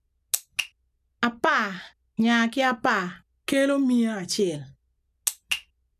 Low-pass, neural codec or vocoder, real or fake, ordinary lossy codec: 14.4 kHz; none; real; none